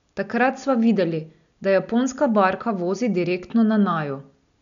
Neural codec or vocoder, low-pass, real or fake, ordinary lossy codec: none; 7.2 kHz; real; none